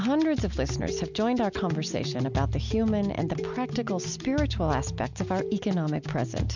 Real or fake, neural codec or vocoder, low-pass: real; none; 7.2 kHz